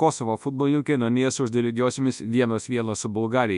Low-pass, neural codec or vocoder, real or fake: 10.8 kHz; codec, 24 kHz, 0.9 kbps, WavTokenizer, large speech release; fake